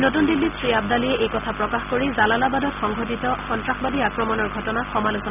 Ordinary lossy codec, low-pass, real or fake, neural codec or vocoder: none; 3.6 kHz; real; none